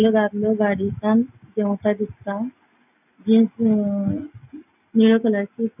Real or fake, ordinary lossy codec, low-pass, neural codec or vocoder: real; none; 3.6 kHz; none